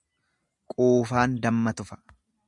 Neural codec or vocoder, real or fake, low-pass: none; real; 10.8 kHz